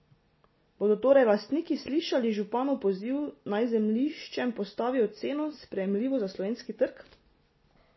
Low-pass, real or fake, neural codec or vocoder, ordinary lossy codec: 7.2 kHz; real; none; MP3, 24 kbps